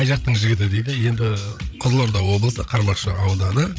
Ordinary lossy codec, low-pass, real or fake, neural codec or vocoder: none; none; fake; codec, 16 kHz, 16 kbps, FreqCodec, larger model